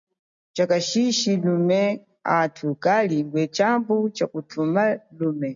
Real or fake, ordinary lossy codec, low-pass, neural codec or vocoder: real; MP3, 64 kbps; 7.2 kHz; none